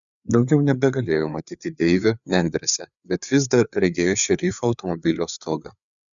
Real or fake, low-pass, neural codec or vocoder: fake; 7.2 kHz; codec, 16 kHz, 4 kbps, FreqCodec, larger model